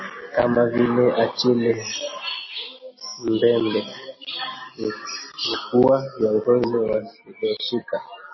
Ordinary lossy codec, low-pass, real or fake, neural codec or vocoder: MP3, 24 kbps; 7.2 kHz; real; none